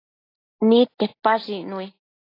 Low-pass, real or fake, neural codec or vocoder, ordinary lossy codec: 5.4 kHz; real; none; AAC, 24 kbps